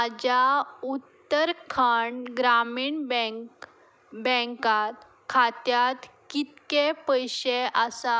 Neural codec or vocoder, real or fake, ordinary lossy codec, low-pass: none; real; none; none